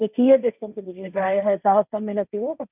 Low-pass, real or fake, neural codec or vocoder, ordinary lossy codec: 3.6 kHz; fake; codec, 16 kHz, 1.1 kbps, Voila-Tokenizer; none